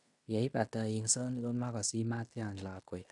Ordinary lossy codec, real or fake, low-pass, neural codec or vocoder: none; fake; 10.8 kHz; codec, 16 kHz in and 24 kHz out, 0.9 kbps, LongCat-Audio-Codec, fine tuned four codebook decoder